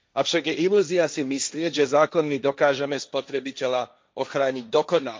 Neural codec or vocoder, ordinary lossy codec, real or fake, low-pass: codec, 16 kHz, 1.1 kbps, Voila-Tokenizer; none; fake; none